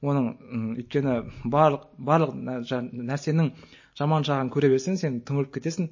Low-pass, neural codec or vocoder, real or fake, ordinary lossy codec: 7.2 kHz; none; real; MP3, 32 kbps